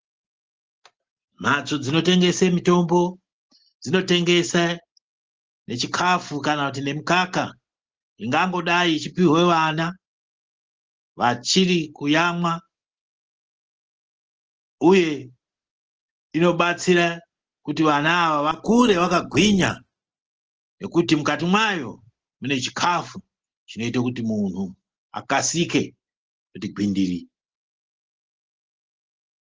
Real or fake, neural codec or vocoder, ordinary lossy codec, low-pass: real; none; Opus, 16 kbps; 7.2 kHz